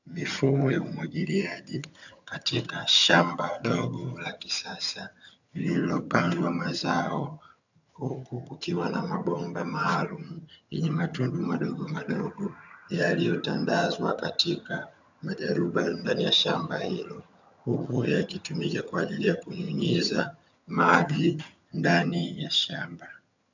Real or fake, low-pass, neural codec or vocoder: fake; 7.2 kHz; vocoder, 22.05 kHz, 80 mel bands, HiFi-GAN